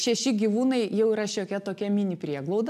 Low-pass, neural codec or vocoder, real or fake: 14.4 kHz; none; real